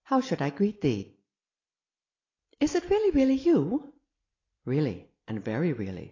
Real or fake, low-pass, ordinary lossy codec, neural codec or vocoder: real; 7.2 kHz; AAC, 32 kbps; none